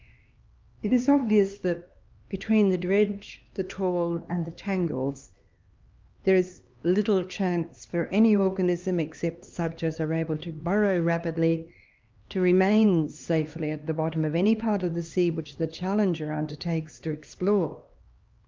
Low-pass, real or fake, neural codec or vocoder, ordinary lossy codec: 7.2 kHz; fake; codec, 16 kHz, 2 kbps, X-Codec, HuBERT features, trained on LibriSpeech; Opus, 24 kbps